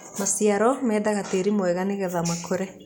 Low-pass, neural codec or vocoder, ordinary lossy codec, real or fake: none; none; none; real